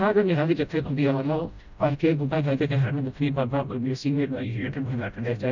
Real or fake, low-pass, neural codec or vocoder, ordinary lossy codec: fake; 7.2 kHz; codec, 16 kHz, 0.5 kbps, FreqCodec, smaller model; none